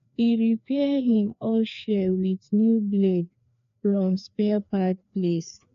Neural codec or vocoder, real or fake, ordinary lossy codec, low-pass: codec, 16 kHz, 2 kbps, FreqCodec, larger model; fake; AAC, 64 kbps; 7.2 kHz